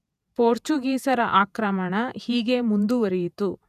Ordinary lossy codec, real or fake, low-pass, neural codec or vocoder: none; fake; 14.4 kHz; vocoder, 48 kHz, 128 mel bands, Vocos